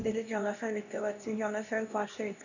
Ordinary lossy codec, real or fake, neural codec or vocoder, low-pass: none; fake; codec, 16 kHz, 1 kbps, X-Codec, HuBERT features, trained on LibriSpeech; 7.2 kHz